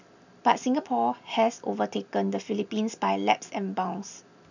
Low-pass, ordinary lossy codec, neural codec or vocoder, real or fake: 7.2 kHz; none; none; real